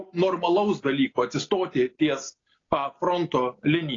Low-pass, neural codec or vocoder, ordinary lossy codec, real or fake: 7.2 kHz; none; AAC, 32 kbps; real